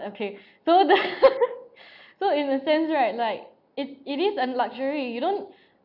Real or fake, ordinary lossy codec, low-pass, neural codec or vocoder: real; none; 5.4 kHz; none